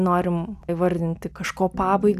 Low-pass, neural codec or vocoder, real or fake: 14.4 kHz; none; real